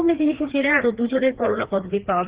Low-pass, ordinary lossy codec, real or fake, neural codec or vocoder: 3.6 kHz; Opus, 16 kbps; fake; codec, 16 kHz, 2 kbps, FreqCodec, larger model